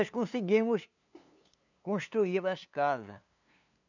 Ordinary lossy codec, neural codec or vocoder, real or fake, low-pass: none; codec, 16 kHz, 2 kbps, FunCodec, trained on LibriTTS, 25 frames a second; fake; 7.2 kHz